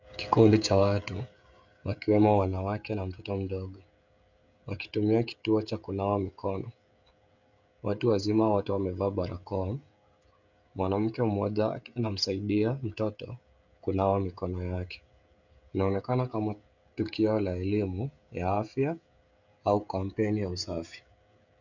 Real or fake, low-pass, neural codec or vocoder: fake; 7.2 kHz; codec, 16 kHz, 16 kbps, FreqCodec, smaller model